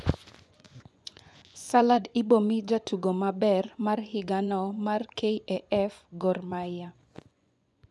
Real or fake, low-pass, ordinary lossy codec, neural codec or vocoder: fake; none; none; vocoder, 24 kHz, 100 mel bands, Vocos